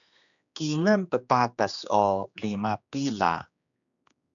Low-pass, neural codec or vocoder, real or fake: 7.2 kHz; codec, 16 kHz, 2 kbps, X-Codec, HuBERT features, trained on general audio; fake